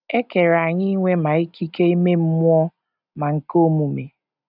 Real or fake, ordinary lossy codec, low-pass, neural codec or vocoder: real; none; 5.4 kHz; none